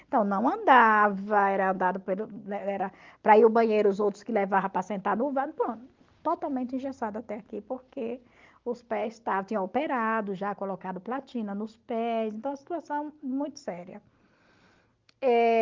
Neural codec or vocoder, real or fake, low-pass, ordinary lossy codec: none; real; 7.2 kHz; Opus, 32 kbps